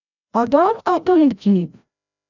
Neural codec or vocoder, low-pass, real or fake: codec, 16 kHz, 0.5 kbps, FreqCodec, larger model; 7.2 kHz; fake